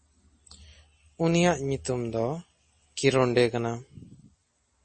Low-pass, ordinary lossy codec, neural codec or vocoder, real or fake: 9.9 kHz; MP3, 32 kbps; none; real